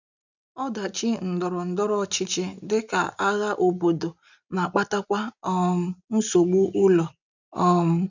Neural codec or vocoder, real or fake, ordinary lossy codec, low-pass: none; real; none; 7.2 kHz